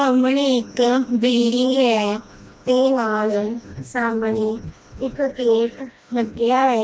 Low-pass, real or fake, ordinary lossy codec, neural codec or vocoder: none; fake; none; codec, 16 kHz, 1 kbps, FreqCodec, smaller model